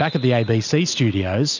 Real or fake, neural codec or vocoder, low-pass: real; none; 7.2 kHz